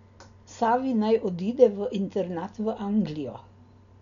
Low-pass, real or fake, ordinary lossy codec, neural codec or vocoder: 7.2 kHz; real; none; none